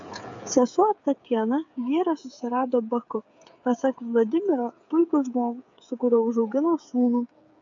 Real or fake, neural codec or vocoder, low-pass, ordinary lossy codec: fake; codec, 16 kHz, 8 kbps, FreqCodec, smaller model; 7.2 kHz; AAC, 64 kbps